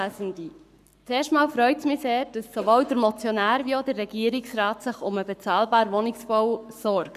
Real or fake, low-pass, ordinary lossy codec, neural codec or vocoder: fake; 14.4 kHz; none; codec, 44.1 kHz, 7.8 kbps, Pupu-Codec